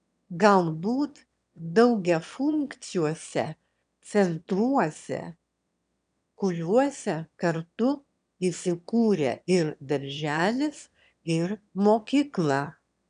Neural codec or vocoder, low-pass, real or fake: autoencoder, 22.05 kHz, a latent of 192 numbers a frame, VITS, trained on one speaker; 9.9 kHz; fake